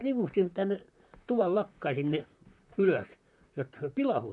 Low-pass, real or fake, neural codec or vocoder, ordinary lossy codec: 10.8 kHz; fake; vocoder, 44.1 kHz, 128 mel bands, Pupu-Vocoder; none